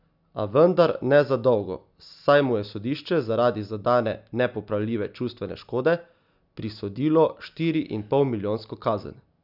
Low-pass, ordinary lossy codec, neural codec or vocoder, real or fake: 5.4 kHz; none; none; real